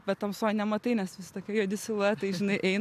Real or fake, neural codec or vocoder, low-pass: real; none; 14.4 kHz